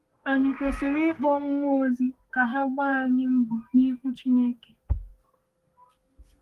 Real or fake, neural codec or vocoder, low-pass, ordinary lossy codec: fake; codec, 32 kHz, 1.9 kbps, SNAC; 14.4 kHz; Opus, 24 kbps